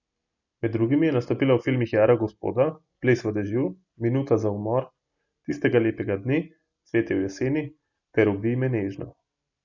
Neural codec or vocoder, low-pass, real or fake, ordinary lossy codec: none; 7.2 kHz; real; none